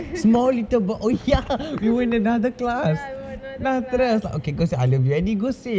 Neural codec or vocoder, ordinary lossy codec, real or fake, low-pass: none; none; real; none